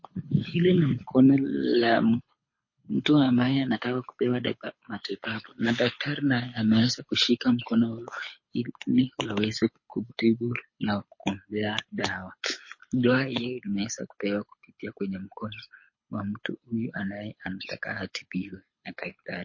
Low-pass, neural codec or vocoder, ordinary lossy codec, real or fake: 7.2 kHz; codec, 24 kHz, 6 kbps, HILCodec; MP3, 32 kbps; fake